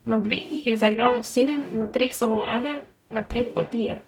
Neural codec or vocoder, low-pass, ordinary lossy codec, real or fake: codec, 44.1 kHz, 0.9 kbps, DAC; 19.8 kHz; none; fake